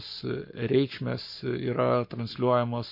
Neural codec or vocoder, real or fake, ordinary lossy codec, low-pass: codec, 44.1 kHz, 7.8 kbps, Pupu-Codec; fake; MP3, 32 kbps; 5.4 kHz